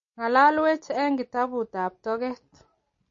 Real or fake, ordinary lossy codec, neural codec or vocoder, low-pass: real; MP3, 32 kbps; none; 7.2 kHz